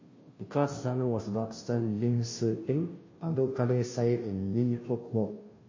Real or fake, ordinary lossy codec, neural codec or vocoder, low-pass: fake; MP3, 32 kbps; codec, 16 kHz, 0.5 kbps, FunCodec, trained on Chinese and English, 25 frames a second; 7.2 kHz